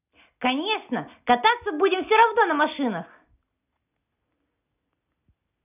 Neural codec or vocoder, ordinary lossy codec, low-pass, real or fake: none; none; 3.6 kHz; real